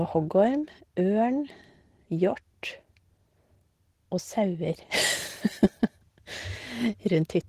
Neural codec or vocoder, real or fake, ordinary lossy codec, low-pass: none; real; Opus, 16 kbps; 14.4 kHz